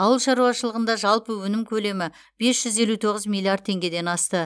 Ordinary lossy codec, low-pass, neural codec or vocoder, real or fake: none; none; none; real